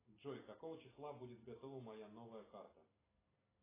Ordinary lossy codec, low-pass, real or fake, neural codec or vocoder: AAC, 16 kbps; 3.6 kHz; real; none